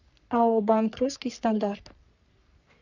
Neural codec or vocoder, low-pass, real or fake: codec, 44.1 kHz, 3.4 kbps, Pupu-Codec; 7.2 kHz; fake